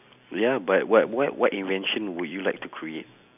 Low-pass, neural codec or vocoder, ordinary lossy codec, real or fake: 3.6 kHz; none; none; real